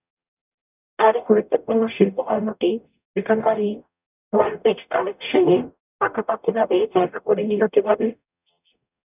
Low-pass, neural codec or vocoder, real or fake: 3.6 kHz; codec, 44.1 kHz, 0.9 kbps, DAC; fake